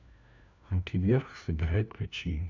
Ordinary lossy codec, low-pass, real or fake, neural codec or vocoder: none; 7.2 kHz; fake; codec, 16 kHz, 1 kbps, FunCodec, trained on LibriTTS, 50 frames a second